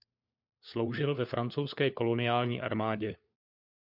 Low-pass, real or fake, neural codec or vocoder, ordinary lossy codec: 5.4 kHz; fake; codec, 16 kHz, 4 kbps, FunCodec, trained on LibriTTS, 50 frames a second; MP3, 48 kbps